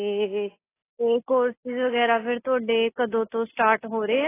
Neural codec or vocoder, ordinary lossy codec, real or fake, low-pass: none; AAC, 16 kbps; real; 3.6 kHz